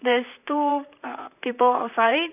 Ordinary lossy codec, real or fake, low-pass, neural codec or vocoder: none; fake; 3.6 kHz; vocoder, 44.1 kHz, 128 mel bands, Pupu-Vocoder